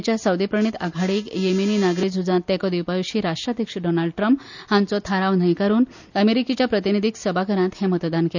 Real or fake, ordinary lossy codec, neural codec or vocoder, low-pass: real; none; none; 7.2 kHz